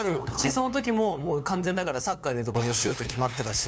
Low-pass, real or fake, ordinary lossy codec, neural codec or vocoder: none; fake; none; codec, 16 kHz, 2 kbps, FunCodec, trained on LibriTTS, 25 frames a second